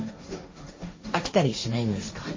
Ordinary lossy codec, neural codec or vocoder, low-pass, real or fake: MP3, 32 kbps; codec, 16 kHz, 1.1 kbps, Voila-Tokenizer; 7.2 kHz; fake